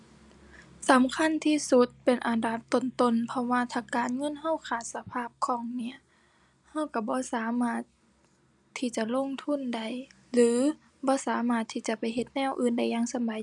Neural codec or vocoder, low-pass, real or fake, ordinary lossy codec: none; 10.8 kHz; real; none